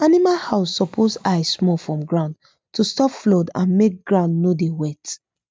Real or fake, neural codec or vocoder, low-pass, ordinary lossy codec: real; none; none; none